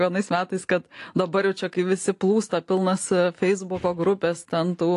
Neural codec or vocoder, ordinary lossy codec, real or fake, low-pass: none; AAC, 48 kbps; real; 10.8 kHz